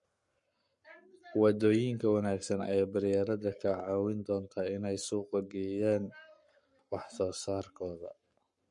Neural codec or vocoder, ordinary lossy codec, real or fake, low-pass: codec, 44.1 kHz, 7.8 kbps, Pupu-Codec; MP3, 48 kbps; fake; 10.8 kHz